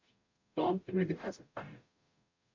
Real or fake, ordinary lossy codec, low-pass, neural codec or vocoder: fake; MP3, 48 kbps; 7.2 kHz; codec, 44.1 kHz, 0.9 kbps, DAC